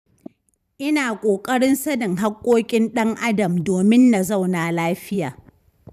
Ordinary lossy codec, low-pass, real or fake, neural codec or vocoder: none; 14.4 kHz; fake; vocoder, 44.1 kHz, 128 mel bands every 512 samples, BigVGAN v2